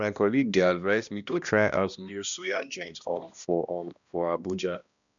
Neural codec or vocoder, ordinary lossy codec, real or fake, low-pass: codec, 16 kHz, 1 kbps, X-Codec, HuBERT features, trained on balanced general audio; none; fake; 7.2 kHz